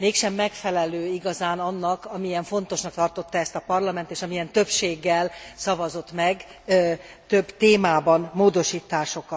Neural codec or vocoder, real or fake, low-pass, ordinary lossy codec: none; real; none; none